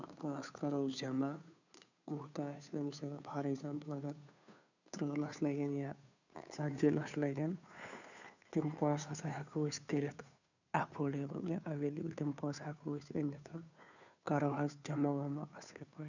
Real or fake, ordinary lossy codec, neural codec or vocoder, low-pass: fake; none; codec, 16 kHz, 2 kbps, FunCodec, trained on Chinese and English, 25 frames a second; 7.2 kHz